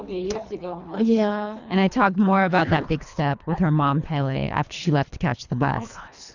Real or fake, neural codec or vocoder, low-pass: fake; codec, 24 kHz, 3 kbps, HILCodec; 7.2 kHz